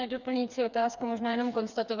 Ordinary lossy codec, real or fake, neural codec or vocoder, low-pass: Opus, 64 kbps; fake; codec, 16 kHz, 4 kbps, FreqCodec, smaller model; 7.2 kHz